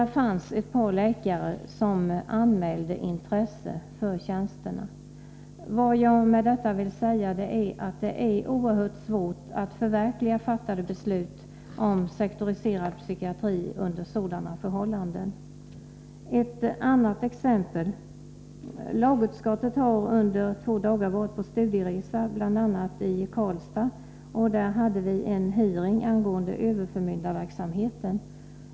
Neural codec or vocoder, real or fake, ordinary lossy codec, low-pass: none; real; none; none